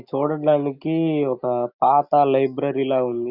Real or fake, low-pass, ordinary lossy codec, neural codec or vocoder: real; 5.4 kHz; Opus, 64 kbps; none